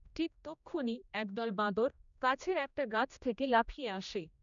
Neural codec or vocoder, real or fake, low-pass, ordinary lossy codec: codec, 16 kHz, 1 kbps, X-Codec, HuBERT features, trained on general audio; fake; 7.2 kHz; none